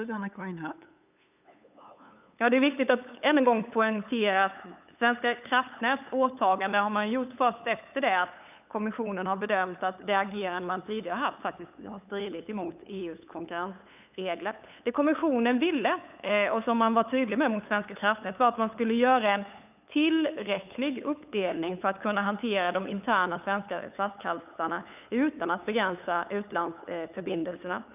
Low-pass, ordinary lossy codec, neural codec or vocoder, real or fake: 3.6 kHz; none; codec, 16 kHz, 8 kbps, FunCodec, trained on LibriTTS, 25 frames a second; fake